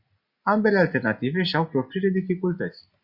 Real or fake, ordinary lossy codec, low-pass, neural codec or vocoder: real; Opus, 64 kbps; 5.4 kHz; none